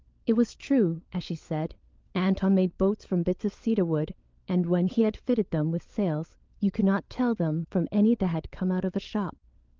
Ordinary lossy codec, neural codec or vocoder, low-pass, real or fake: Opus, 24 kbps; codec, 16 kHz, 16 kbps, FunCodec, trained on LibriTTS, 50 frames a second; 7.2 kHz; fake